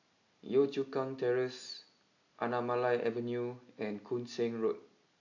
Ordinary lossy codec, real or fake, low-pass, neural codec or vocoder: AAC, 48 kbps; real; 7.2 kHz; none